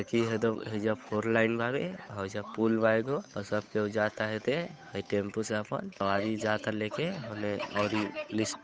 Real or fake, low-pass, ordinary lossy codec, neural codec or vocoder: fake; none; none; codec, 16 kHz, 8 kbps, FunCodec, trained on Chinese and English, 25 frames a second